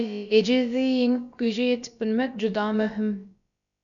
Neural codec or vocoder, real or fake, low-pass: codec, 16 kHz, about 1 kbps, DyCAST, with the encoder's durations; fake; 7.2 kHz